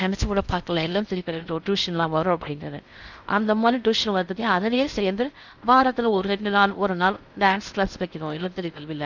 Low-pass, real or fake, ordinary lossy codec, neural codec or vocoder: 7.2 kHz; fake; none; codec, 16 kHz in and 24 kHz out, 0.6 kbps, FocalCodec, streaming, 4096 codes